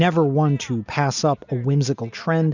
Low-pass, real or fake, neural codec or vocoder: 7.2 kHz; real; none